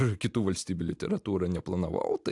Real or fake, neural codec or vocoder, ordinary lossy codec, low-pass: real; none; AAC, 64 kbps; 10.8 kHz